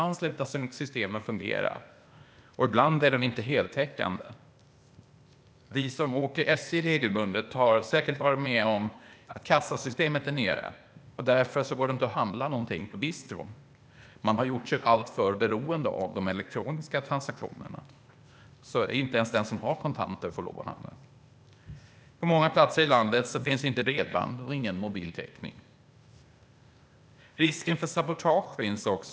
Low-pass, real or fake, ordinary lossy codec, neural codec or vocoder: none; fake; none; codec, 16 kHz, 0.8 kbps, ZipCodec